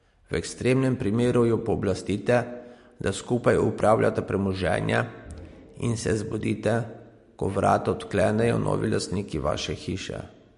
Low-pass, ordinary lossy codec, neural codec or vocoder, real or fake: 10.8 kHz; MP3, 48 kbps; none; real